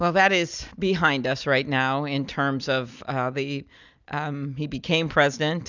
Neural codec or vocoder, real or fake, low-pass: none; real; 7.2 kHz